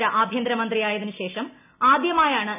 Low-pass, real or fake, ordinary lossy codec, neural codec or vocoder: 3.6 kHz; real; none; none